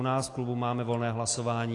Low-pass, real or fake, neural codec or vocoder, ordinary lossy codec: 10.8 kHz; real; none; AAC, 48 kbps